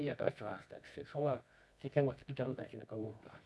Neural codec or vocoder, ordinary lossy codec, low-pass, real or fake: codec, 24 kHz, 0.9 kbps, WavTokenizer, medium music audio release; none; none; fake